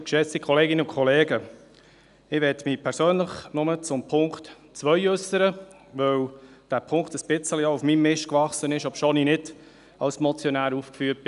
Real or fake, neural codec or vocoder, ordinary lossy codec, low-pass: real; none; none; 10.8 kHz